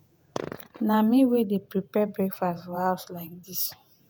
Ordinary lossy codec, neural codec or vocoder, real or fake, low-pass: none; vocoder, 48 kHz, 128 mel bands, Vocos; fake; 19.8 kHz